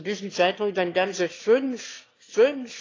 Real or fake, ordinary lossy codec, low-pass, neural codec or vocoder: fake; AAC, 32 kbps; 7.2 kHz; autoencoder, 22.05 kHz, a latent of 192 numbers a frame, VITS, trained on one speaker